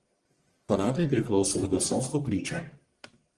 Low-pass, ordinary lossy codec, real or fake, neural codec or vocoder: 10.8 kHz; Opus, 24 kbps; fake; codec, 44.1 kHz, 1.7 kbps, Pupu-Codec